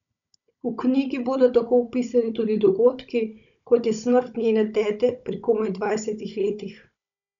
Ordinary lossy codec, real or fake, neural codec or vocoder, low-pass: none; fake; codec, 16 kHz, 16 kbps, FunCodec, trained on Chinese and English, 50 frames a second; 7.2 kHz